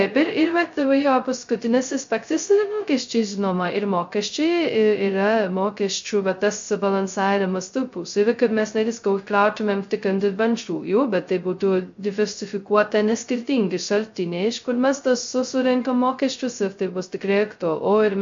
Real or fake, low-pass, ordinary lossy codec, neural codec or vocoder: fake; 7.2 kHz; MP3, 48 kbps; codec, 16 kHz, 0.2 kbps, FocalCodec